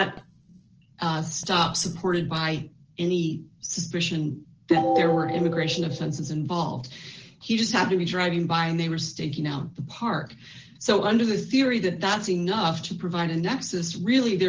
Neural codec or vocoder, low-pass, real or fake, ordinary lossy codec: none; 7.2 kHz; real; Opus, 16 kbps